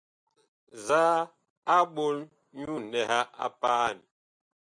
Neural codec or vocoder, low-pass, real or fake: none; 9.9 kHz; real